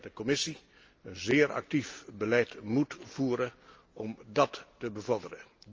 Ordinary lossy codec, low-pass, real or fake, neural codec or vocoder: Opus, 24 kbps; 7.2 kHz; real; none